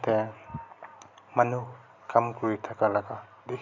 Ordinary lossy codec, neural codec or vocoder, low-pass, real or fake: none; none; 7.2 kHz; real